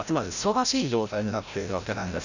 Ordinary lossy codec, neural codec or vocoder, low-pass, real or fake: none; codec, 16 kHz, 0.5 kbps, FreqCodec, larger model; 7.2 kHz; fake